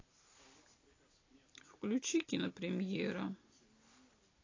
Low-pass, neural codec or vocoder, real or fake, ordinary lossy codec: 7.2 kHz; none; real; AAC, 32 kbps